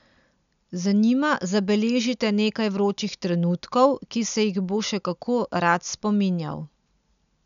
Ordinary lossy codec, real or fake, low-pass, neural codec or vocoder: none; real; 7.2 kHz; none